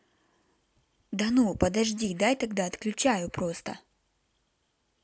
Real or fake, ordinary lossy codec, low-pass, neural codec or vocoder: real; none; none; none